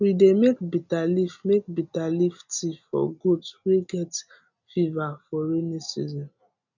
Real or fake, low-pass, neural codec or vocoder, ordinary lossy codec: real; 7.2 kHz; none; none